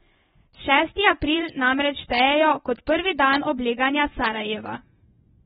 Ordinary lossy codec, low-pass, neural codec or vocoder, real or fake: AAC, 16 kbps; 10.8 kHz; vocoder, 24 kHz, 100 mel bands, Vocos; fake